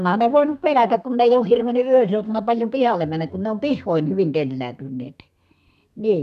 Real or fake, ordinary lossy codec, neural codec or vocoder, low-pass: fake; none; codec, 32 kHz, 1.9 kbps, SNAC; 14.4 kHz